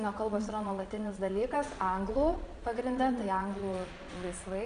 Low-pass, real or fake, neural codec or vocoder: 9.9 kHz; fake; vocoder, 22.05 kHz, 80 mel bands, Vocos